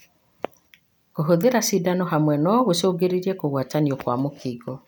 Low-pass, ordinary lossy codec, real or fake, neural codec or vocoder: none; none; real; none